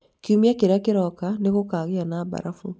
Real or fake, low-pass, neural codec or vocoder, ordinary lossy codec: real; none; none; none